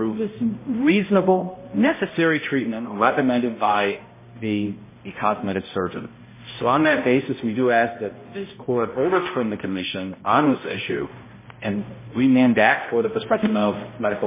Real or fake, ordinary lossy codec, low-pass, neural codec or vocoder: fake; MP3, 16 kbps; 3.6 kHz; codec, 16 kHz, 0.5 kbps, X-Codec, HuBERT features, trained on balanced general audio